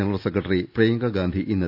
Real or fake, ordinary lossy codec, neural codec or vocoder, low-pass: real; none; none; 5.4 kHz